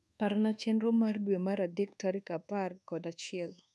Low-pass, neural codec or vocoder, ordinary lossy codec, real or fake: none; codec, 24 kHz, 1.2 kbps, DualCodec; none; fake